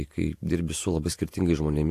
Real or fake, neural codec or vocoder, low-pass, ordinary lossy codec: fake; vocoder, 48 kHz, 128 mel bands, Vocos; 14.4 kHz; AAC, 64 kbps